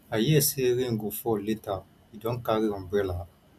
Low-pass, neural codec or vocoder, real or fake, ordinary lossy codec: 14.4 kHz; none; real; none